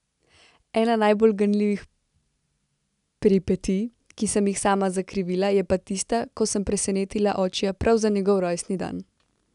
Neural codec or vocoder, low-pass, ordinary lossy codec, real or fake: none; 10.8 kHz; none; real